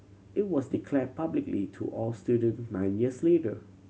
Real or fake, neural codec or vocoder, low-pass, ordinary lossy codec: real; none; none; none